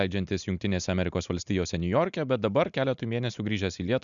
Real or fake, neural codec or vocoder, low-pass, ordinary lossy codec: real; none; 7.2 kHz; MP3, 96 kbps